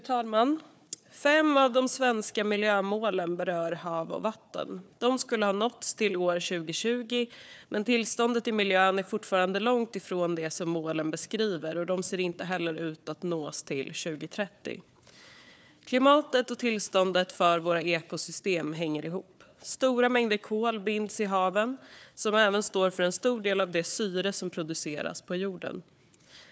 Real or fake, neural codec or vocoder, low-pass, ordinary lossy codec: fake; codec, 16 kHz, 4 kbps, FunCodec, trained on Chinese and English, 50 frames a second; none; none